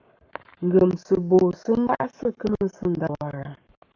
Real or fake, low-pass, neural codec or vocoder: fake; 7.2 kHz; codec, 44.1 kHz, 7.8 kbps, Pupu-Codec